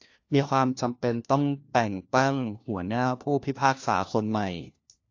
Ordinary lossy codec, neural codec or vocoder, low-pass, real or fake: AAC, 48 kbps; codec, 16 kHz, 1 kbps, FunCodec, trained on LibriTTS, 50 frames a second; 7.2 kHz; fake